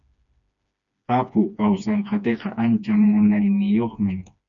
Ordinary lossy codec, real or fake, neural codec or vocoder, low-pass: MP3, 96 kbps; fake; codec, 16 kHz, 2 kbps, FreqCodec, smaller model; 7.2 kHz